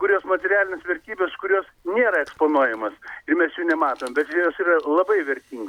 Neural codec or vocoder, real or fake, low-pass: none; real; 19.8 kHz